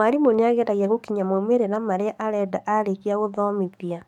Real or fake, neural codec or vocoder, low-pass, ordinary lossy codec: fake; codec, 44.1 kHz, 7.8 kbps, DAC; 19.8 kHz; MP3, 96 kbps